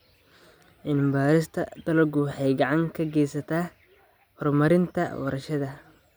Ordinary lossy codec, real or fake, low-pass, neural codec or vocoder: none; real; none; none